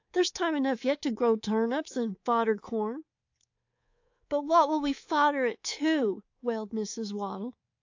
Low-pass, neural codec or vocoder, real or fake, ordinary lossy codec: 7.2 kHz; codec, 24 kHz, 3.1 kbps, DualCodec; fake; AAC, 48 kbps